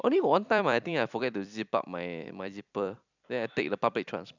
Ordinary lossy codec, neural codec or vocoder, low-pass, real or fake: none; vocoder, 44.1 kHz, 128 mel bands every 256 samples, BigVGAN v2; 7.2 kHz; fake